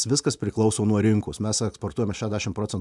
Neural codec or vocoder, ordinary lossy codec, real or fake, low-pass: none; MP3, 96 kbps; real; 10.8 kHz